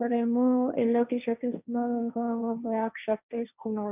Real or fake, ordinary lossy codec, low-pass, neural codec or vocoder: fake; none; 3.6 kHz; codec, 16 kHz, 1.1 kbps, Voila-Tokenizer